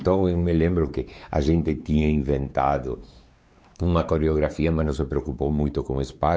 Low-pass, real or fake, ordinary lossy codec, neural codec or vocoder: none; fake; none; codec, 16 kHz, 4 kbps, X-Codec, WavLM features, trained on Multilingual LibriSpeech